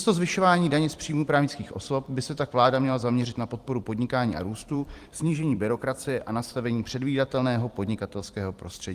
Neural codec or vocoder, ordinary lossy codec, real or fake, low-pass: vocoder, 44.1 kHz, 128 mel bands every 256 samples, BigVGAN v2; Opus, 24 kbps; fake; 14.4 kHz